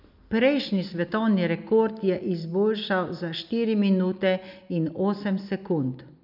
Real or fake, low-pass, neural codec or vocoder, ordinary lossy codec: real; 5.4 kHz; none; none